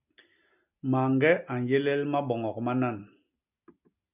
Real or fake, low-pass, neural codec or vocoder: real; 3.6 kHz; none